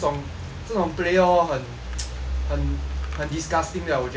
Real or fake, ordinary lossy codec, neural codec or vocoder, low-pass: real; none; none; none